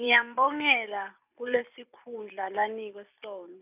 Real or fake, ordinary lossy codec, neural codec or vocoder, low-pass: fake; none; vocoder, 44.1 kHz, 128 mel bands every 256 samples, BigVGAN v2; 3.6 kHz